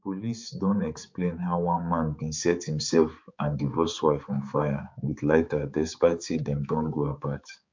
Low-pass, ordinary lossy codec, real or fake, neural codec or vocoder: 7.2 kHz; MP3, 64 kbps; fake; codec, 16 kHz, 4 kbps, X-Codec, HuBERT features, trained on balanced general audio